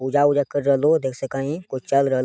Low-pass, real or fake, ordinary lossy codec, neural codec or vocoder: none; real; none; none